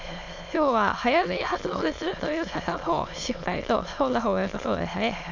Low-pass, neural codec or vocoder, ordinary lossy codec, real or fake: 7.2 kHz; autoencoder, 22.05 kHz, a latent of 192 numbers a frame, VITS, trained on many speakers; MP3, 64 kbps; fake